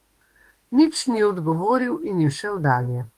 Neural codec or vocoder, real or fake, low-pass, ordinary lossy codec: autoencoder, 48 kHz, 32 numbers a frame, DAC-VAE, trained on Japanese speech; fake; 14.4 kHz; Opus, 24 kbps